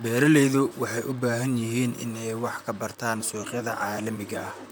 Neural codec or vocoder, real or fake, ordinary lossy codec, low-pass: vocoder, 44.1 kHz, 128 mel bands, Pupu-Vocoder; fake; none; none